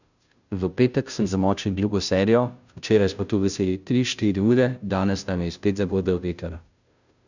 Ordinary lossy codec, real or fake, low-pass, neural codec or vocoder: none; fake; 7.2 kHz; codec, 16 kHz, 0.5 kbps, FunCodec, trained on Chinese and English, 25 frames a second